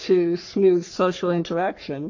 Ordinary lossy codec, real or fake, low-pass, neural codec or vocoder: AAC, 48 kbps; fake; 7.2 kHz; codec, 44.1 kHz, 3.4 kbps, Pupu-Codec